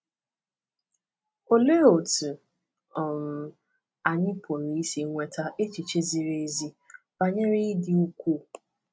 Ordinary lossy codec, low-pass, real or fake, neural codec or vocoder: none; none; real; none